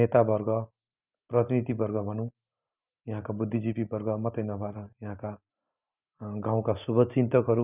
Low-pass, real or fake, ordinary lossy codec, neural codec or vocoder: 3.6 kHz; real; none; none